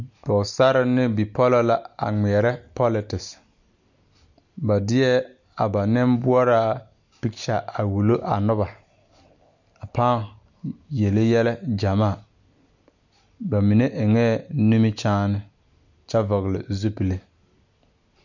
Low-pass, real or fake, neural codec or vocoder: 7.2 kHz; real; none